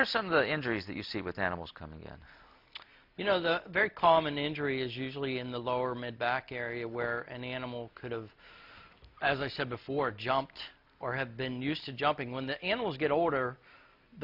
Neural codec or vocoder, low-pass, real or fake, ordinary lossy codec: none; 5.4 kHz; real; MP3, 48 kbps